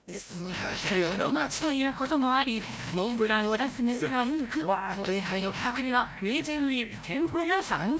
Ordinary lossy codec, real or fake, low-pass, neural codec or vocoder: none; fake; none; codec, 16 kHz, 0.5 kbps, FreqCodec, larger model